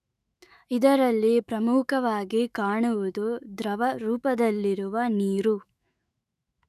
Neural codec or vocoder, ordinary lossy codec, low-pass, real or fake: autoencoder, 48 kHz, 128 numbers a frame, DAC-VAE, trained on Japanese speech; none; 14.4 kHz; fake